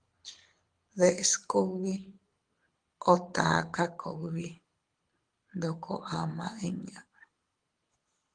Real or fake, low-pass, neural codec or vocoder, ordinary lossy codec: fake; 9.9 kHz; codec, 24 kHz, 6 kbps, HILCodec; Opus, 24 kbps